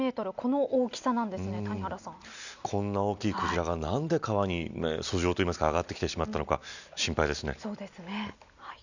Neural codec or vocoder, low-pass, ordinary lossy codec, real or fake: none; 7.2 kHz; none; real